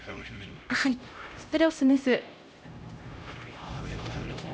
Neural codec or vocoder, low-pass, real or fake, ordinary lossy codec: codec, 16 kHz, 0.5 kbps, X-Codec, HuBERT features, trained on LibriSpeech; none; fake; none